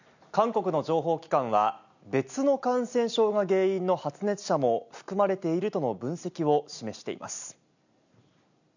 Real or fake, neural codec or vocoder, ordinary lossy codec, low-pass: real; none; none; 7.2 kHz